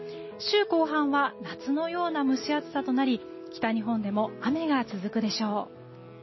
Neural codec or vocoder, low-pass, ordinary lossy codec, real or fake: none; 7.2 kHz; MP3, 24 kbps; real